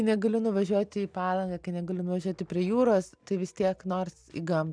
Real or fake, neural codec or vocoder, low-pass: real; none; 9.9 kHz